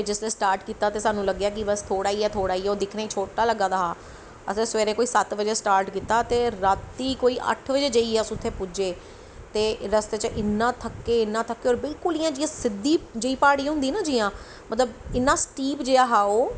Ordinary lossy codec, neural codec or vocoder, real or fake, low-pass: none; none; real; none